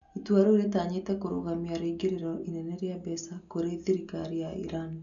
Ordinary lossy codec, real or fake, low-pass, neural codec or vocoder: none; real; 7.2 kHz; none